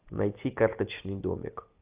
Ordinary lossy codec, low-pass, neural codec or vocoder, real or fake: Opus, 24 kbps; 3.6 kHz; none; real